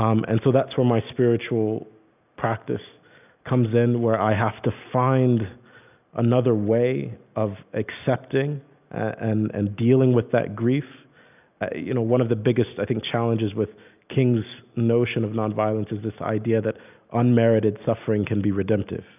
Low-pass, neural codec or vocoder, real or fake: 3.6 kHz; none; real